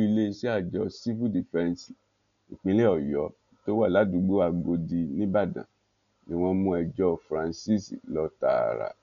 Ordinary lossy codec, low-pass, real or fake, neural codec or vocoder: none; 7.2 kHz; real; none